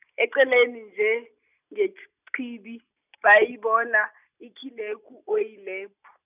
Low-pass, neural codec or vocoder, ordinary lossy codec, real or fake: 3.6 kHz; none; none; real